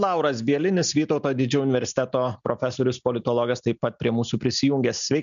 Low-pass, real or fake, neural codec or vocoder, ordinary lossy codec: 7.2 kHz; real; none; MP3, 96 kbps